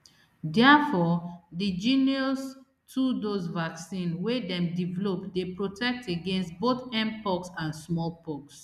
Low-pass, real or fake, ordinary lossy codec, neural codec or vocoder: 14.4 kHz; real; none; none